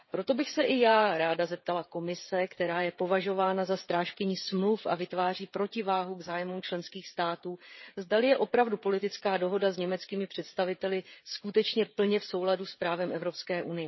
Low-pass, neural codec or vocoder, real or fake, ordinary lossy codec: 7.2 kHz; codec, 16 kHz, 16 kbps, FreqCodec, smaller model; fake; MP3, 24 kbps